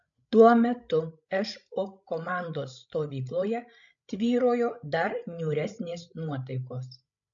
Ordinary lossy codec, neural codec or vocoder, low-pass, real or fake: AAC, 64 kbps; codec, 16 kHz, 16 kbps, FreqCodec, larger model; 7.2 kHz; fake